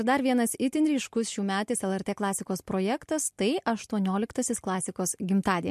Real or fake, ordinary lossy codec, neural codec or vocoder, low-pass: real; MP3, 64 kbps; none; 14.4 kHz